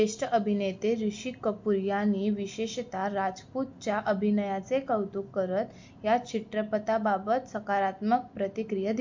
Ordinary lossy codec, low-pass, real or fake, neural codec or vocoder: MP3, 48 kbps; 7.2 kHz; real; none